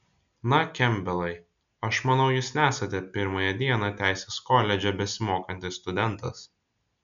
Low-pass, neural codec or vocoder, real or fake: 7.2 kHz; none; real